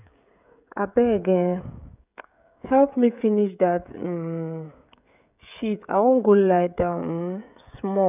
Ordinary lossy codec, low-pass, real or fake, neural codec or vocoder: none; 3.6 kHz; fake; codec, 16 kHz, 16 kbps, FreqCodec, smaller model